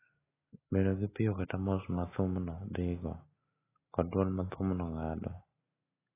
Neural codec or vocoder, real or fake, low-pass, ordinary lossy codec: none; real; 3.6 kHz; AAC, 16 kbps